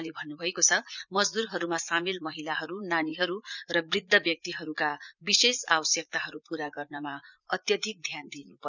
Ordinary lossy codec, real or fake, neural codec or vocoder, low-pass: none; fake; vocoder, 44.1 kHz, 80 mel bands, Vocos; 7.2 kHz